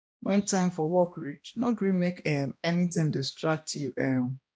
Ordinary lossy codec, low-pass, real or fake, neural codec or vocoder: none; none; fake; codec, 16 kHz, 1 kbps, X-Codec, HuBERT features, trained on balanced general audio